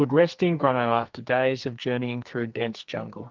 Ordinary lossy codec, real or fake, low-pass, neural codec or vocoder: Opus, 32 kbps; fake; 7.2 kHz; codec, 44.1 kHz, 2.6 kbps, SNAC